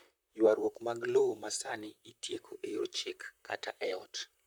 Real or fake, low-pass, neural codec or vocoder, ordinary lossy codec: fake; none; vocoder, 44.1 kHz, 128 mel bands, Pupu-Vocoder; none